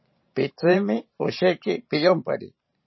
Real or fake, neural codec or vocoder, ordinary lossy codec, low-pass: fake; vocoder, 44.1 kHz, 128 mel bands every 512 samples, BigVGAN v2; MP3, 24 kbps; 7.2 kHz